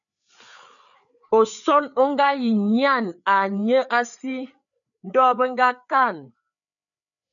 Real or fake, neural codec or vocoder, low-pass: fake; codec, 16 kHz, 4 kbps, FreqCodec, larger model; 7.2 kHz